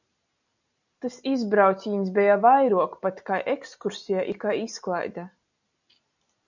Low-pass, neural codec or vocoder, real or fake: 7.2 kHz; none; real